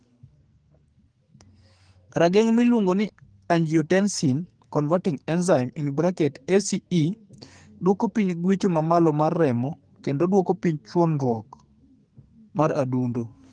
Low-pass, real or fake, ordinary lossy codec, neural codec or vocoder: 9.9 kHz; fake; Opus, 24 kbps; codec, 44.1 kHz, 2.6 kbps, SNAC